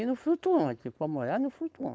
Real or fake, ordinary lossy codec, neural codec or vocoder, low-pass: fake; none; codec, 16 kHz, 4.8 kbps, FACodec; none